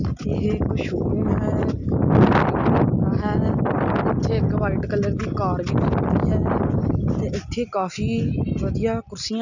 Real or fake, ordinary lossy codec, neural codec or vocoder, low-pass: real; none; none; 7.2 kHz